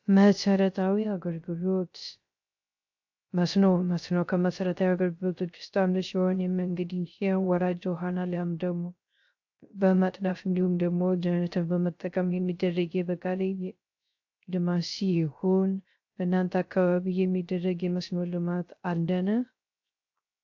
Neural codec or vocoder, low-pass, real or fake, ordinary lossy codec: codec, 16 kHz, 0.3 kbps, FocalCodec; 7.2 kHz; fake; AAC, 48 kbps